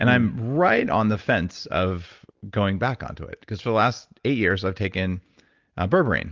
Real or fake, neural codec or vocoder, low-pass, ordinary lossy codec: real; none; 7.2 kHz; Opus, 32 kbps